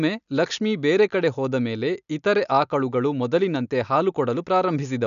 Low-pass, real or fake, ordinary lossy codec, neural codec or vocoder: 7.2 kHz; real; none; none